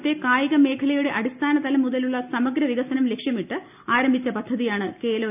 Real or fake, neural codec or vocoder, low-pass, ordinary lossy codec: real; none; 3.6 kHz; none